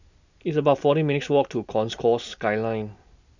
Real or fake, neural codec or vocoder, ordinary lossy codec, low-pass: real; none; none; 7.2 kHz